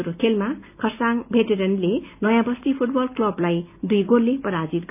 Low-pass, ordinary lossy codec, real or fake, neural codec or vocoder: 3.6 kHz; none; real; none